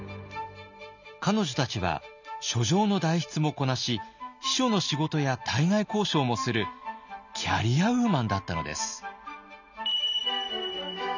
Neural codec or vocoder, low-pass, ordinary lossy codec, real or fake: none; 7.2 kHz; none; real